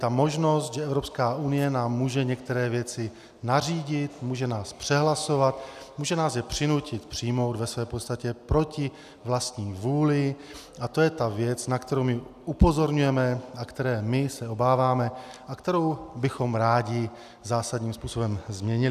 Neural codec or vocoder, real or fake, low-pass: none; real; 14.4 kHz